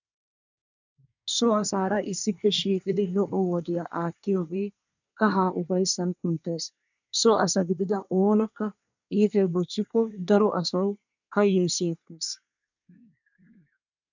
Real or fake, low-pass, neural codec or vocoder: fake; 7.2 kHz; codec, 24 kHz, 1 kbps, SNAC